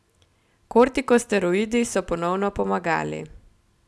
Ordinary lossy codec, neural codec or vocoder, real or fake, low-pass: none; none; real; none